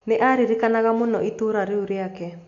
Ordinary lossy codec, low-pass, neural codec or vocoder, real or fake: AAC, 48 kbps; 7.2 kHz; none; real